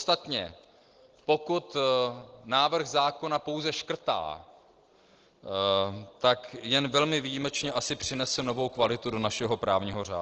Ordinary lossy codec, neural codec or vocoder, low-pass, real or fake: Opus, 16 kbps; none; 7.2 kHz; real